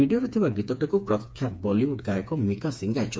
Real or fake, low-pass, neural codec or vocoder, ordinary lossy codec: fake; none; codec, 16 kHz, 4 kbps, FreqCodec, smaller model; none